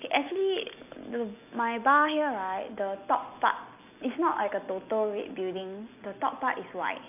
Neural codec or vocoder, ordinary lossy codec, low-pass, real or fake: none; AAC, 32 kbps; 3.6 kHz; real